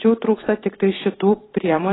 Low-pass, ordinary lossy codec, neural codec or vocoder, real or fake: 7.2 kHz; AAC, 16 kbps; vocoder, 44.1 kHz, 128 mel bands every 256 samples, BigVGAN v2; fake